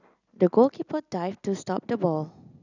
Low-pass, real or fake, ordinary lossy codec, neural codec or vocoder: 7.2 kHz; real; none; none